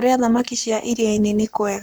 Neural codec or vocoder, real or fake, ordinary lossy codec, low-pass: codec, 44.1 kHz, 7.8 kbps, Pupu-Codec; fake; none; none